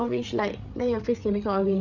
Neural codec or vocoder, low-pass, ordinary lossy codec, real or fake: codec, 16 kHz, 8 kbps, FreqCodec, larger model; 7.2 kHz; none; fake